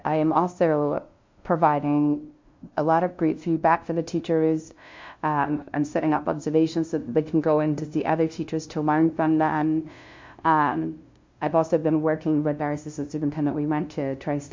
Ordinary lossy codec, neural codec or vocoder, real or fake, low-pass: MP3, 48 kbps; codec, 16 kHz, 0.5 kbps, FunCodec, trained on LibriTTS, 25 frames a second; fake; 7.2 kHz